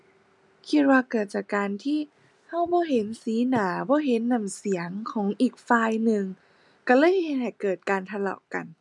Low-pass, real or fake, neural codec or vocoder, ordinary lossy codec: 10.8 kHz; real; none; none